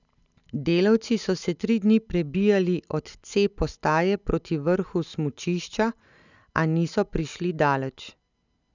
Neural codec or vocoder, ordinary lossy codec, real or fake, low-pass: none; none; real; 7.2 kHz